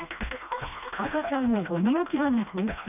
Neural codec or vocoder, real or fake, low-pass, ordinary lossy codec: codec, 16 kHz, 1 kbps, FreqCodec, smaller model; fake; 3.6 kHz; none